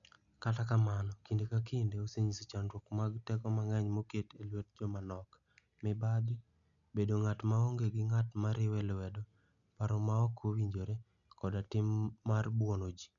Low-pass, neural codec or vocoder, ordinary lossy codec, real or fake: 7.2 kHz; none; none; real